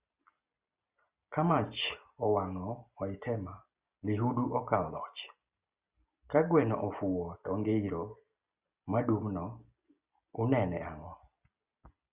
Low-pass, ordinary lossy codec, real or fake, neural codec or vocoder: 3.6 kHz; Opus, 64 kbps; real; none